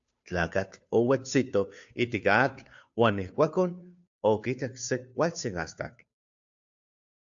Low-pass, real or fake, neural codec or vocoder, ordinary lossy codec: 7.2 kHz; fake; codec, 16 kHz, 2 kbps, FunCodec, trained on Chinese and English, 25 frames a second; AAC, 64 kbps